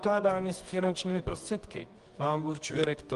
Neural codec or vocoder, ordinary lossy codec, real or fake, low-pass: codec, 24 kHz, 0.9 kbps, WavTokenizer, medium music audio release; Opus, 24 kbps; fake; 10.8 kHz